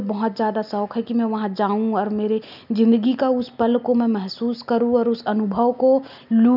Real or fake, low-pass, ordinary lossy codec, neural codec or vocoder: real; 5.4 kHz; none; none